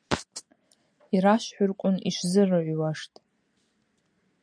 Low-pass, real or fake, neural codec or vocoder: 9.9 kHz; real; none